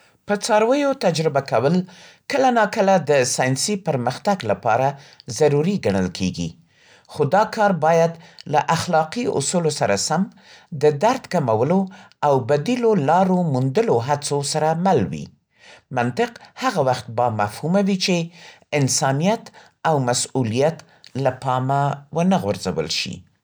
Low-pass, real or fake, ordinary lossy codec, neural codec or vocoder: none; real; none; none